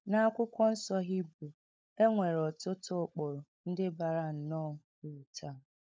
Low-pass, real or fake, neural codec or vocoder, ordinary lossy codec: none; fake; codec, 16 kHz, 16 kbps, FunCodec, trained on LibriTTS, 50 frames a second; none